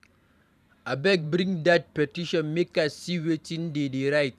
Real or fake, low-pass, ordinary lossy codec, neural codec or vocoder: fake; 14.4 kHz; none; vocoder, 44.1 kHz, 128 mel bands every 512 samples, BigVGAN v2